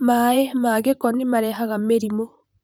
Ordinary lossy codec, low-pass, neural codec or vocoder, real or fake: none; none; vocoder, 44.1 kHz, 128 mel bands, Pupu-Vocoder; fake